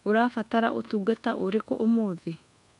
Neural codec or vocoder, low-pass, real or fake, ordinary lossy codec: codec, 24 kHz, 1.2 kbps, DualCodec; 10.8 kHz; fake; none